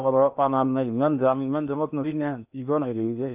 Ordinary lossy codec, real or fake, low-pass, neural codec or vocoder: none; fake; 3.6 kHz; codec, 16 kHz in and 24 kHz out, 0.8 kbps, FocalCodec, streaming, 65536 codes